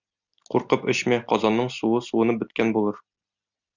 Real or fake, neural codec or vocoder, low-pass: real; none; 7.2 kHz